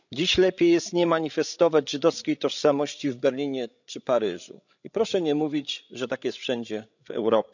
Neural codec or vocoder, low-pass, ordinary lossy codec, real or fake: codec, 16 kHz, 16 kbps, FreqCodec, larger model; 7.2 kHz; none; fake